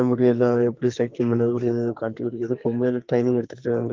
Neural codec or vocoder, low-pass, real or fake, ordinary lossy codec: codec, 44.1 kHz, 2.6 kbps, SNAC; 7.2 kHz; fake; Opus, 32 kbps